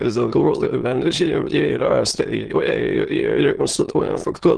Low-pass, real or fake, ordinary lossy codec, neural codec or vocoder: 9.9 kHz; fake; Opus, 16 kbps; autoencoder, 22.05 kHz, a latent of 192 numbers a frame, VITS, trained on many speakers